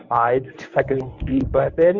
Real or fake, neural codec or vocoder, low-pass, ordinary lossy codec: fake; codec, 24 kHz, 0.9 kbps, WavTokenizer, medium speech release version 1; 7.2 kHz; Opus, 64 kbps